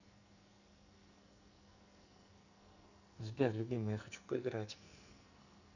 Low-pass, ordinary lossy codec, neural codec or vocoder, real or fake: 7.2 kHz; none; codec, 44.1 kHz, 2.6 kbps, SNAC; fake